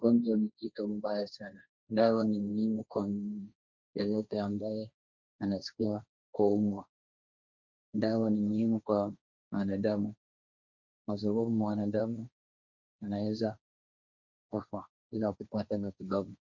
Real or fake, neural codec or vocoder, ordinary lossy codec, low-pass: fake; codec, 16 kHz, 1.1 kbps, Voila-Tokenizer; Opus, 64 kbps; 7.2 kHz